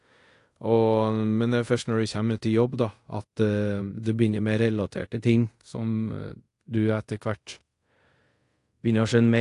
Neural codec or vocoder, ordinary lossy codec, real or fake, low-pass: codec, 24 kHz, 0.5 kbps, DualCodec; AAC, 48 kbps; fake; 10.8 kHz